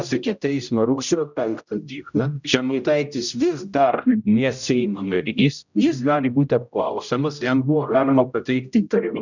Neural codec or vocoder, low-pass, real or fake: codec, 16 kHz, 0.5 kbps, X-Codec, HuBERT features, trained on general audio; 7.2 kHz; fake